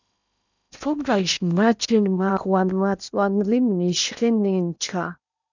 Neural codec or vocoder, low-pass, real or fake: codec, 16 kHz in and 24 kHz out, 0.8 kbps, FocalCodec, streaming, 65536 codes; 7.2 kHz; fake